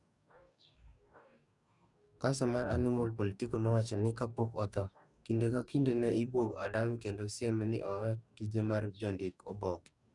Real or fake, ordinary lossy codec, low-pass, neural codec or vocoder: fake; none; 10.8 kHz; codec, 44.1 kHz, 2.6 kbps, DAC